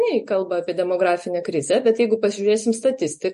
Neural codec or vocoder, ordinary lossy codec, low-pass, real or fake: none; MP3, 48 kbps; 14.4 kHz; real